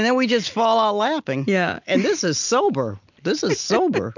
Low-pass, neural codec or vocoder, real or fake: 7.2 kHz; none; real